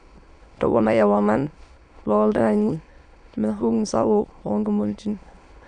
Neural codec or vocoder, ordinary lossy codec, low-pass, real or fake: autoencoder, 22.05 kHz, a latent of 192 numbers a frame, VITS, trained on many speakers; none; 9.9 kHz; fake